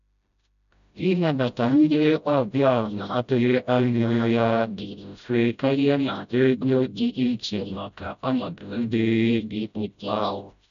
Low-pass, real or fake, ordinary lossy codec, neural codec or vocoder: 7.2 kHz; fake; none; codec, 16 kHz, 0.5 kbps, FreqCodec, smaller model